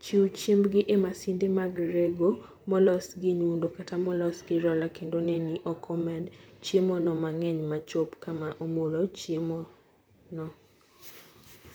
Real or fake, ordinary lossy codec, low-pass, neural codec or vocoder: fake; none; none; vocoder, 44.1 kHz, 128 mel bands, Pupu-Vocoder